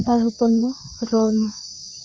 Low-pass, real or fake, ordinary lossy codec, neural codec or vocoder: none; fake; none; codec, 16 kHz, 2 kbps, FreqCodec, larger model